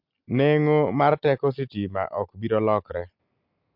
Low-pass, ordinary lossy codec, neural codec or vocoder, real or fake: 5.4 kHz; MP3, 48 kbps; none; real